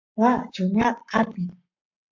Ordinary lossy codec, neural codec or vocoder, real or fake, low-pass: MP3, 48 kbps; codec, 44.1 kHz, 7.8 kbps, Pupu-Codec; fake; 7.2 kHz